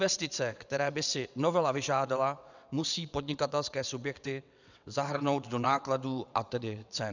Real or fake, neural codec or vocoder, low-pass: fake; vocoder, 22.05 kHz, 80 mel bands, WaveNeXt; 7.2 kHz